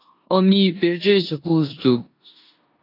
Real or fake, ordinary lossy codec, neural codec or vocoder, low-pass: fake; AAC, 32 kbps; codec, 16 kHz in and 24 kHz out, 0.9 kbps, LongCat-Audio-Codec, four codebook decoder; 5.4 kHz